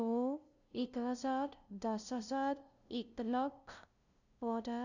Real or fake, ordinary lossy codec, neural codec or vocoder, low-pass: fake; none; codec, 16 kHz, 0.5 kbps, FunCodec, trained on LibriTTS, 25 frames a second; 7.2 kHz